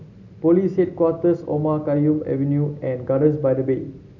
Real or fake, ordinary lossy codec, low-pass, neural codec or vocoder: real; none; 7.2 kHz; none